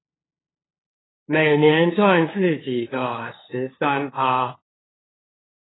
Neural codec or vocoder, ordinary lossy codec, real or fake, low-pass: codec, 16 kHz, 2 kbps, FunCodec, trained on LibriTTS, 25 frames a second; AAC, 16 kbps; fake; 7.2 kHz